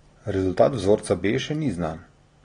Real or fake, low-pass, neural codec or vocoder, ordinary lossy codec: real; 9.9 kHz; none; AAC, 32 kbps